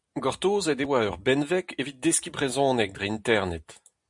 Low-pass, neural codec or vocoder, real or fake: 10.8 kHz; none; real